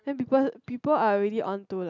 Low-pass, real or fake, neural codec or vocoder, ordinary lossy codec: 7.2 kHz; real; none; none